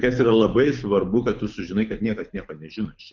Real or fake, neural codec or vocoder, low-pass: fake; codec, 24 kHz, 6 kbps, HILCodec; 7.2 kHz